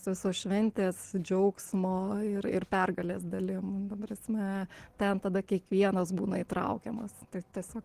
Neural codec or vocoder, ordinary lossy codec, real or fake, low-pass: none; Opus, 16 kbps; real; 14.4 kHz